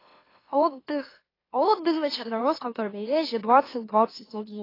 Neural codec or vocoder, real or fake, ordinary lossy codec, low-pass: autoencoder, 44.1 kHz, a latent of 192 numbers a frame, MeloTTS; fake; AAC, 24 kbps; 5.4 kHz